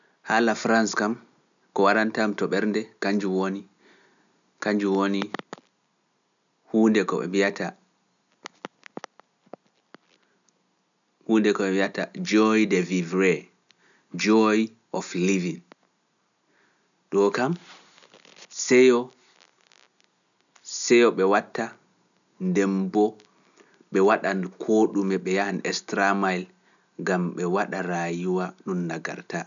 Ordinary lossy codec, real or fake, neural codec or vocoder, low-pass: none; real; none; 7.2 kHz